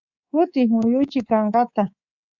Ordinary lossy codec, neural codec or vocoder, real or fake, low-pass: Opus, 64 kbps; codec, 24 kHz, 3.1 kbps, DualCodec; fake; 7.2 kHz